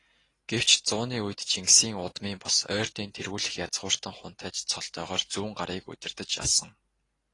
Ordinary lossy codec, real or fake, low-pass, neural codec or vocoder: AAC, 32 kbps; real; 10.8 kHz; none